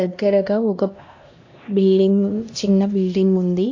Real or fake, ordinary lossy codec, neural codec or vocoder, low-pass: fake; AAC, 48 kbps; codec, 16 kHz, 1 kbps, X-Codec, HuBERT features, trained on LibriSpeech; 7.2 kHz